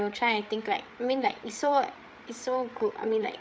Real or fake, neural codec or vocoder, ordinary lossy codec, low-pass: fake; codec, 16 kHz, 8 kbps, FreqCodec, larger model; none; none